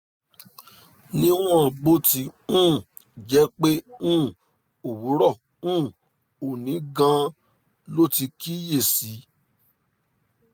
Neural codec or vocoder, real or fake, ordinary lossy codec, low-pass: none; real; none; none